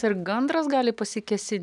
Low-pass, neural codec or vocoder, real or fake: 10.8 kHz; none; real